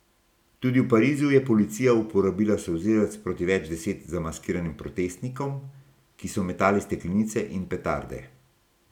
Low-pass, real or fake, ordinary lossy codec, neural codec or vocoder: 19.8 kHz; real; none; none